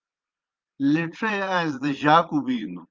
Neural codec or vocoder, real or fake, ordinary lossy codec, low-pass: vocoder, 22.05 kHz, 80 mel bands, Vocos; fake; Opus, 32 kbps; 7.2 kHz